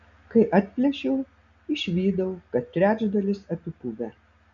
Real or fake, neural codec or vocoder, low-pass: real; none; 7.2 kHz